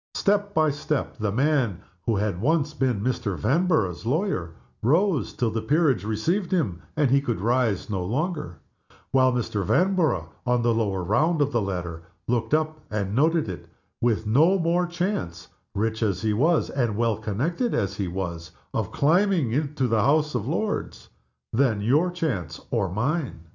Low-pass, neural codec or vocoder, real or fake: 7.2 kHz; none; real